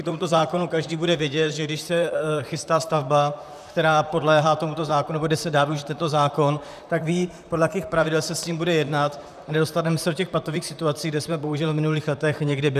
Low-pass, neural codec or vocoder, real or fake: 14.4 kHz; vocoder, 44.1 kHz, 128 mel bands, Pupu-Vocoder; fake